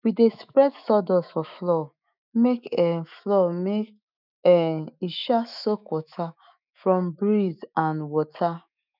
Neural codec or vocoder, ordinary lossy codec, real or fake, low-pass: autoencoder, 48 kHz, 128 numbers a frame, DAC-VAE, trained on Japanese speech; none; fake; 5.4 kHz